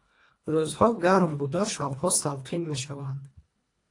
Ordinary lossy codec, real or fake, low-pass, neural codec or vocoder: AAC, 48 kbps; fake; 10.8 kHz; codec, 24 kHz, 1.5 kbps, HILCodec